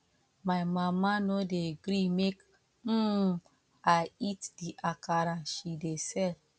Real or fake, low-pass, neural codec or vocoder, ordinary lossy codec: real; none; none; none